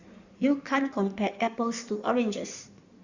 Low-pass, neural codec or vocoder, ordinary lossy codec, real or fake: 7.2 kHz; codec, 16 kHz in and 24 kHz out, 1.1 kbps, FireRedTTS-2 codec; Opus, 64 kbps; fake